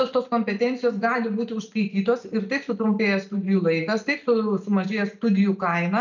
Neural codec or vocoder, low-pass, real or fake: none; 7.2 kHz; real